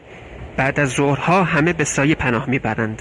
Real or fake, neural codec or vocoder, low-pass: real; none; 10.8 kHz